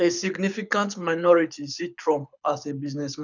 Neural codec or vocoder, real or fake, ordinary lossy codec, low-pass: codec, 24 kHz, 6 kbps, HILCodec; fake; none; 7.2 kHz